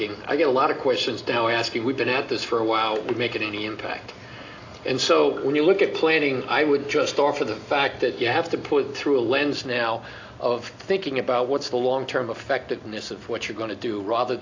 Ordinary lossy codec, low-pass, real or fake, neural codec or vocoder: AAC, 48 kbps; 7.2 kHz; real; none